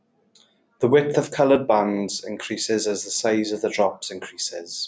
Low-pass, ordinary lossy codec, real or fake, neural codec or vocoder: none; none; real; none